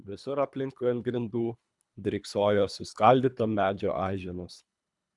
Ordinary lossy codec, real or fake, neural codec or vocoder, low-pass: MP3, 96 kbps; fake; codec, 24 kHz, 3 kbps, HILCodec; 10.8 kHz